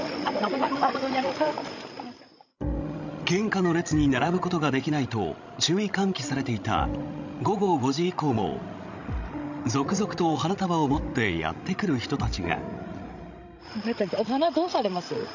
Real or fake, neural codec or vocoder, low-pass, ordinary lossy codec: fake; codec, 16 kHz, 16 kbps, FreqCodec, larger model; 7.2 kHz; none